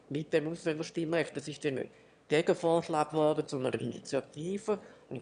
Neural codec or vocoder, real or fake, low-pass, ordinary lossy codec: autoencoder, 22.05 kHz, a latent of 192 numbers a frame, VITS, trained on one speaker; fake; 9.9 kHz; none